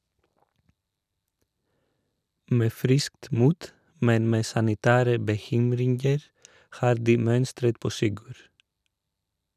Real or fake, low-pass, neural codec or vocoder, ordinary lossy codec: real; 14.4 kHz; none; none